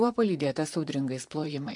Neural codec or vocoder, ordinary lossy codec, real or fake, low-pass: vocoder, 44.1 kHz, 128 mel bands, Pupu-Vocoder; MP3, 64 kbps; fake; 10.8 kHz